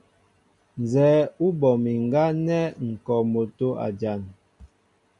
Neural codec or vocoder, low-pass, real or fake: none; 10.8 kHz; real